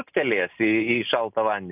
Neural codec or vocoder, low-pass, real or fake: none; 3.6 kHz; real